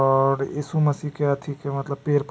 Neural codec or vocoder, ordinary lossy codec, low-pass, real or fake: none; none; none; real